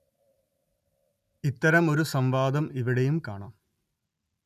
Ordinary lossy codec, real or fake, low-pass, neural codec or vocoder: none; real; 14.4 kHz; none